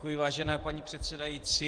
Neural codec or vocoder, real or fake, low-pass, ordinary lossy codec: vocoder, 24 kHz, 100 mel bands, Vocos; fake; 9.9 kHz; Opus, 24 kbps